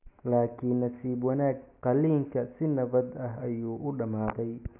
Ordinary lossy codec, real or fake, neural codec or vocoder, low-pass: none; real; none; 3.6 kHz